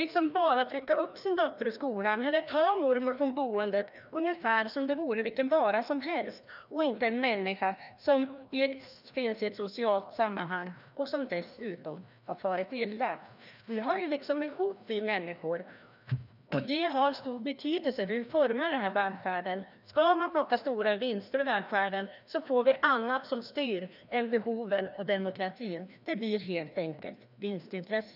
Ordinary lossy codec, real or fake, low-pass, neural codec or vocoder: none; fake; 5.4 kHz; codec, 16 kHz, 1 kbps, FreqCodec, larger model